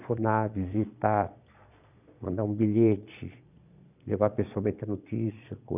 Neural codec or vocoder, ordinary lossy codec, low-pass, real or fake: vocoder, 22.05 kHz, 80 mel bands, Vocos; none; 3.6 kHz; fake